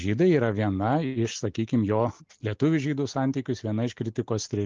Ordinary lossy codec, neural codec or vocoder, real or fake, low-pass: Opus, 24 kbps; none; real; 7.2 kHz